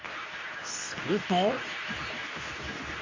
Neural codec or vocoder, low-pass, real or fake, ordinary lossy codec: codec, 24 kHz, 0.9 kbps, WavTokenizer, medium speech release version 2; 7.2 kHz; fake; MP3, 32 kbps